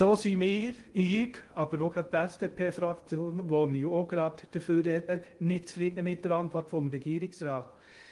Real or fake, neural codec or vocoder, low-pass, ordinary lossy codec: fake; codec, 16 kHz in and 24 kHz out, 0.6 kbps, FocalCodec, streaming, 2048 codes; 10.8 kHz; Opus, 24 kbps